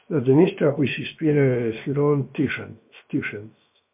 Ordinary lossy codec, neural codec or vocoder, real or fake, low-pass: MP3, 32 kbps; codec, 16 kHz, about 1 kbps, DyCAST, with the encoder's durations; fake; 3.6 kHz